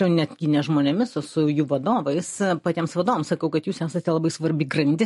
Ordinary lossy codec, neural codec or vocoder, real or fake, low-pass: MP3, 48 kbps; none; real; 14.4 kHz